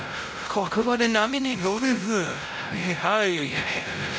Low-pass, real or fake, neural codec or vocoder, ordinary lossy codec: none; fake; codec, 16 kHz, 0.5 kbps, X-Codec, WavLM features, trained on Multilingual LibriSpeech; none